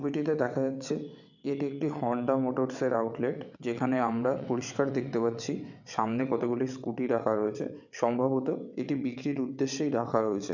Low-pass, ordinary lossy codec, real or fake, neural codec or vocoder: 7.2 kHz; none; fake; vocoder, 22.05 kHz, 80 mel bands, WaveNeXt